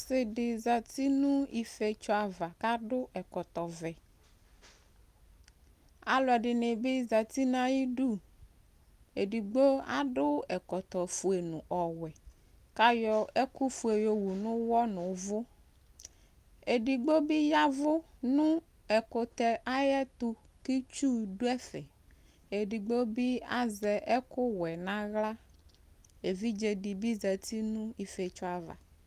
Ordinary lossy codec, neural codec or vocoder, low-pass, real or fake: Opus, 32 kbps; none; 14.4 kHz; real